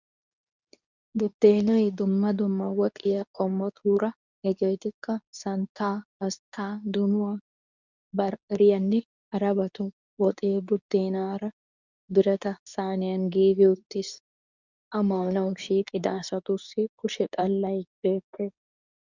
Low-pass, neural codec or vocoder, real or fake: 7.2 kHz; codec, 24 kHz, 0.9 kbps, WavTokenizer, medium speech release version 2; fake